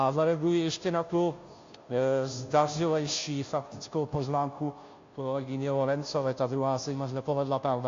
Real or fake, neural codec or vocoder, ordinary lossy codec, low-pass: fake; codec, 16 kHz, 0.5 kbps, FunCodec, trained on Chinese and English, 25 frames a second; AAC, 48 kbps; 7.2 kHz